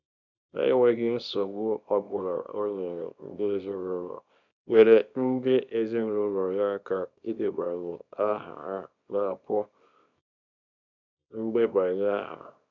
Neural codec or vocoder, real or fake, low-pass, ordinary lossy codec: codec, 24 kHz, 0.9 kbps, WavTokenizer, small release; fake; 7.2 kHz; none